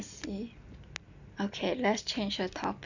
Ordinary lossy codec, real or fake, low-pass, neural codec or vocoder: none; real; 7.2 kHz; none